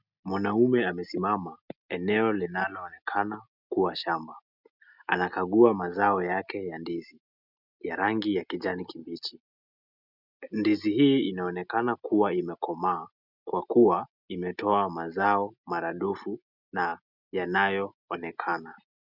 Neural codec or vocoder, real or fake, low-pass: none; real; 5.4 kHz